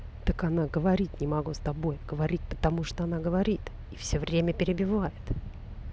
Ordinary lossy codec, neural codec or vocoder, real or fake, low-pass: none; none; real; none